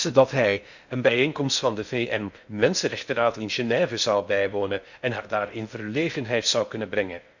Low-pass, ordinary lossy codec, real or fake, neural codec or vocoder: 7.2 kHz; none; fake; codec, 16 kHz in and 24 kHz out, 0.6 kbps, FocalCodec, streaming, 4096 codes